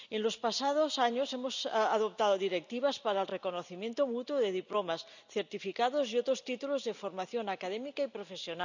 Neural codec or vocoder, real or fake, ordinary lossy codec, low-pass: none; real; none; 7.2 kHz